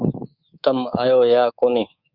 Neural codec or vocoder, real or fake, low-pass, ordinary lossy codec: codec, 24 kHz, 3.1 kbps, DualCodec; fake; 5.4 kHz; Opus, 64 kbps